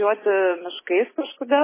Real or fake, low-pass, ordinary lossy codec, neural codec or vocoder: real; 3.6 kHz; MP3, 16 kbps; none